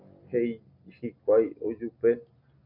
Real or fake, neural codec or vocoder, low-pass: real; none; 5.4 kHz